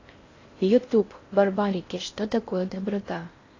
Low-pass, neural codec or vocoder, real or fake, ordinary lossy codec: 7.2 kHz; codec, 16 kHz in and 24 kHz out, 0.6 kbps, FocalCodec, streaming, 4096 codes; fake; AAC, 32 kbps